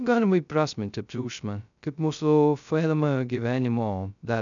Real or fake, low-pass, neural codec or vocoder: fake; 7.2 kHz; codec, 16 kHz, 0.2 kbps, FocalCodec